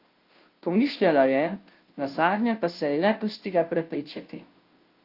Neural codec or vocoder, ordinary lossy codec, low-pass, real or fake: codec, 16 kHz, 0.5 kbps, FunCodec, trained on Chinese and English, 25 frames a second; Opus, 32 kbps; 5.4 kHz; fake